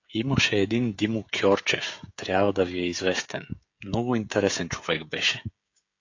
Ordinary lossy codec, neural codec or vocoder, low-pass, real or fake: AAC, 48 kbps; codec, 16 kHz, 16 kbps, FreqCodec, smaller model; 7.2 kHz; fake